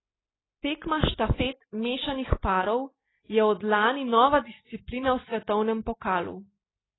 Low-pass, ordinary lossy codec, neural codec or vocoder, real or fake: 7.2 kHz; AAC, 16 kbps; none; real